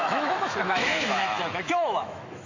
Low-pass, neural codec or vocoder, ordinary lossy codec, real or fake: 7.2 kHz; autoencoder, 48 kHz, 128 numbers a frame, DAC-VAE, trained on Japanese speech; AAC, 48 kbps; fake